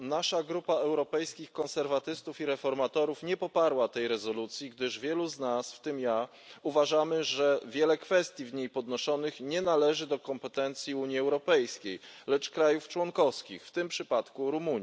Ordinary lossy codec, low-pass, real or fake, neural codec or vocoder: none; none; real; none